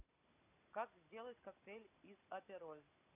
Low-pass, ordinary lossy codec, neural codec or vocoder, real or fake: 3.6 kHz; none; none; real